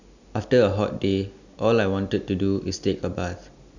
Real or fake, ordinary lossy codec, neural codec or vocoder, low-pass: real; none; none; 7.2 kHz